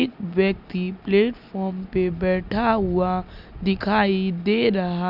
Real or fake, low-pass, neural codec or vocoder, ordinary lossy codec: real; 5.4 kHz; none; none